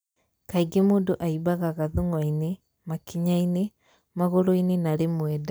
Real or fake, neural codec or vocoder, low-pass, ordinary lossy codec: real; none; none; none